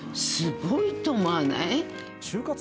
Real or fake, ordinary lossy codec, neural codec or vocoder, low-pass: real; none; none; none